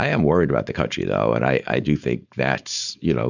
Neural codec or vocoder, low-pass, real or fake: codec, 16 kHz, 4.8 kbps, FACodec; 7.2 kHz; fake